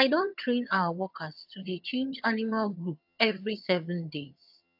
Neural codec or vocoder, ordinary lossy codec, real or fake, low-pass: vocoder, 22.05 kHz, 80 mel bands, HiFi-GAN; AAC, 48 kbps; fake; 5.4 kHz